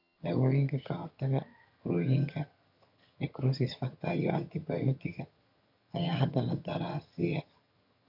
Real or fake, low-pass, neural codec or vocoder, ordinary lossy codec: fake; 5.4 kHz; vocoder, 22.05 kHz, 80 mel bands, HiFi-GAN; none